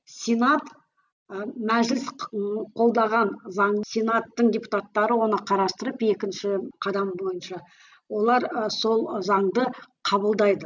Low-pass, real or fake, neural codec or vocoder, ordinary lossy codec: 7.2 kHz; real; none; none